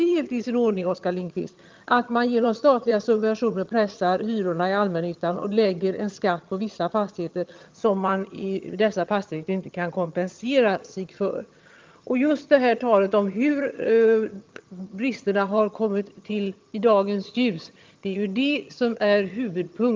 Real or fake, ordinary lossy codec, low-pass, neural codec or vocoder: fake; Opus, 32 kbps; 7.2 kHz; vocoder, 22.05 kHz, 80 mel bands, HiFi-GAN